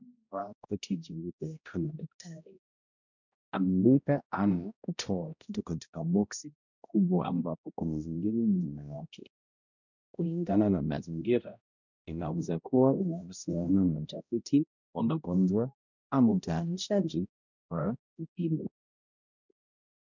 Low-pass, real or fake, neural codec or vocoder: 7.2 kHz; fake; codec, 16 kHz, 0.5 kbps, X-Codec, HuBERT features, trained on balanced general audio